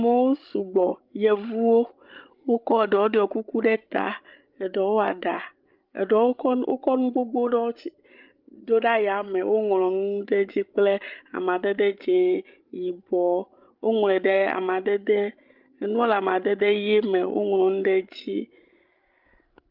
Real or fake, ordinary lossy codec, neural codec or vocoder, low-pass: fake; Opus, 24 kbps; codec, 16 kHz, 16 kbps, FreqCodec, larger model; 5.4 kHz